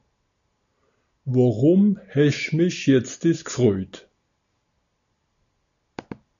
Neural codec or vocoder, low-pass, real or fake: none; 7.2 kHz; real